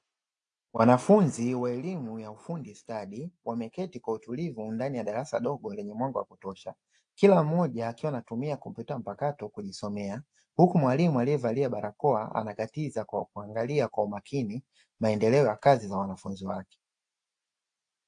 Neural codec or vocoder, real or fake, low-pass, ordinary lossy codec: none; real; 9.9 kHz; AAC, 64 kbps